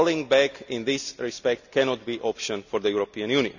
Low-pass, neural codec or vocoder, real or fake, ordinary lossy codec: 7.2 kHz; none; real; none